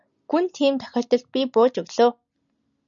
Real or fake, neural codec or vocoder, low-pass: real; none; 7.2 kHz